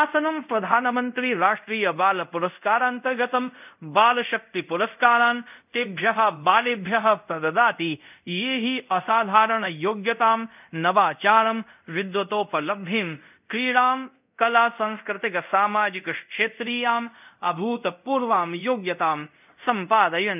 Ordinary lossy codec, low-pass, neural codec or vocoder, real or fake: none; 3.6 kHz; codec, 24 kHz, 0.5 kbps, DualCodec; fake